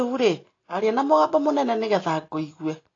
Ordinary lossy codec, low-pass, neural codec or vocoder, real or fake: AAC, 32 kbps; 7.2 kHz; none; real